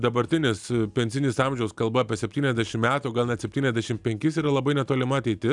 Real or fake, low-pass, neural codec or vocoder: real; 10.8 kHz; none